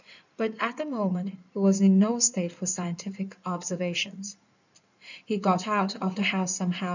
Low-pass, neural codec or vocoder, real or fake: 7.2 kHz; codec, 16 kHz in and 24 kHz out, 2.2 kbps, FireRedTTS-2 codec; fake